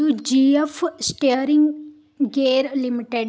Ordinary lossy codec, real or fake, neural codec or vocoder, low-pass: none; real; none; none